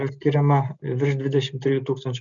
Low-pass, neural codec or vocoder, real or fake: 7.2 kHz; none; real